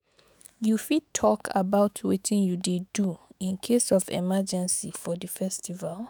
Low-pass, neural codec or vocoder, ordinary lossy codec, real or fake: none; autoencoder, 48 kHz, 128 numbers a frame, DAC-VAE, trained on Japanese speech; none; fake